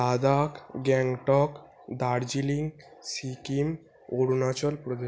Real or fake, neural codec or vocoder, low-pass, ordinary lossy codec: real; none; none; none